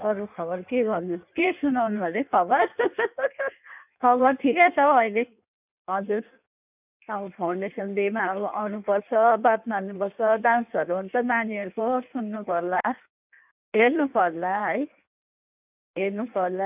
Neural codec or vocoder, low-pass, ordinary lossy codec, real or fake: codec, 16 kHz in and 24 kHz out, 1.1 kbps, FireRedTTS-2 codec; 3.6 kHz; none; fake